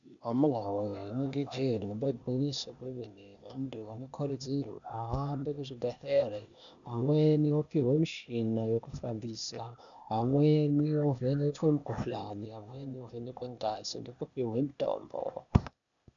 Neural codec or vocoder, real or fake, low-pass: codec, 16 kHz, 0.8 kbps, ZipCodec; fake; 7.2 kHz